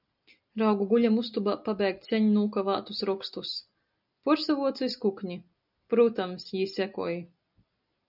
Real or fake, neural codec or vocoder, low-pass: real; none; 5.4 kHz